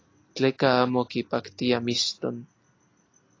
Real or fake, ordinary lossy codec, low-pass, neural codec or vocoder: real; AAC, 32 kbps; 7.2 kHz; none